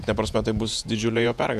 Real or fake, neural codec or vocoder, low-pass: fake; vocoder, 48 kHz, 128 mel bands, Vocos; 14.4 kHz